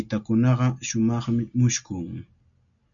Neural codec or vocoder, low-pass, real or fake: none; 7.2 kHz; real